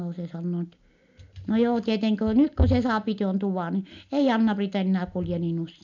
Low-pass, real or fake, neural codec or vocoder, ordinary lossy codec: 7.2 kHz; real; none; none